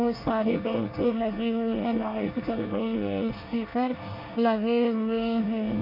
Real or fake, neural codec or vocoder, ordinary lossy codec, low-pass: fake; codec, 24 kHz, 1 kbps, SNAC; none; 5.4 kHz